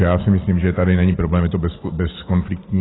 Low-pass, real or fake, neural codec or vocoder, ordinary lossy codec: 7.2 kHz; real; none; AAC, 16 kbps